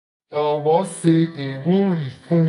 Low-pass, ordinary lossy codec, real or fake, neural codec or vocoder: 10.8 kHz; none; fake; codec, 24 kHz, 0.9 kbps, WavTokenizer, medium music audio release